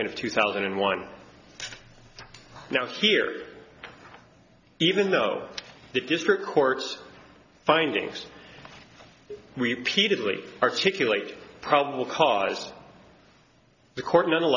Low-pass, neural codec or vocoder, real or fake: 7.2 kHz; none; real